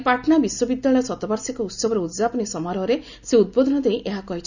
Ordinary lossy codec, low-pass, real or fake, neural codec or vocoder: none; 7.2 kHz; real; none